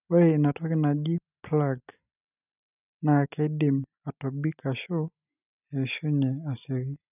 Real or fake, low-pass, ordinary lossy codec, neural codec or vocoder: real; 3.6 kHz; none; none